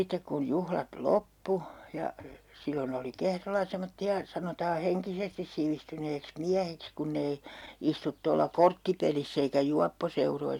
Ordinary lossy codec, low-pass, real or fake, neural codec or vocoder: none; 19.8 kHz; real; none